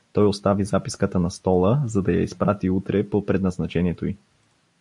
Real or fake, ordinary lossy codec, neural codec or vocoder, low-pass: real; AAC, 64 kbps; none; 10.8 kHz